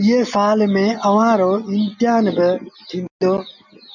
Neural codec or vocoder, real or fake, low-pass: none; real; 7.2 kHz